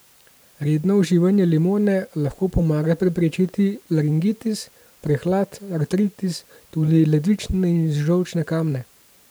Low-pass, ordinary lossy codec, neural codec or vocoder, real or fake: none; none; vocoder, 44.1 kHz, 128 mel bands every 512 samples, BigVGAN v2; fake